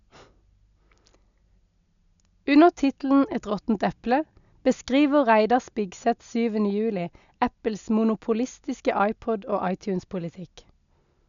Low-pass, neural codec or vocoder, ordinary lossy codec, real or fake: 7.2 kHz; none; none; real